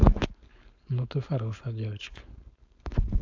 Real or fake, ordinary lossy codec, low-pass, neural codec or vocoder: fake; AAC, 48 kbps; 7.2 kHz; codec, 16 kHz, 4.8 kbps, FACodec